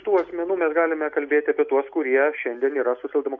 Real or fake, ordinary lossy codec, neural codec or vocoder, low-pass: real; MP3, 64 kbps; none; 7.2 kHz